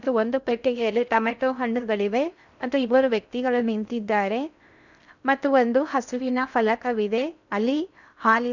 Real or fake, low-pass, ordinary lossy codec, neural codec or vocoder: fake; 7.2 kHz; none; codec, 16 kHz in and 24 kHz out, 0.6 kbps, FocalCodec, streaming, 2048 codes